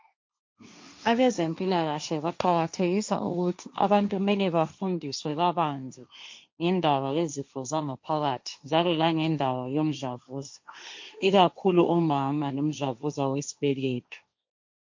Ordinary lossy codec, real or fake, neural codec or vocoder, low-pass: MP3, 48 kbps; fake; codec, 16 kHz, 1.1 kbps, Voila-Tokenizer; 7.2 kHz